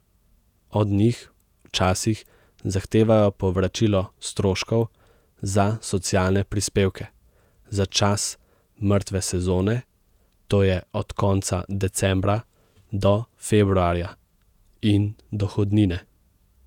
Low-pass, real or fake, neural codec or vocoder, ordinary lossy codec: 19.8 kHz; fake; vocoder, 48 kHz, 128 mel bands, Vocos; none